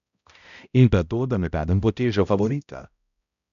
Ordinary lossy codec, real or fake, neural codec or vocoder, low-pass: none; fake; codec, 16 kHz, 0.5 kbps, X-Codec, HuBERT features, trained on balanced general audio; 7.2 kHz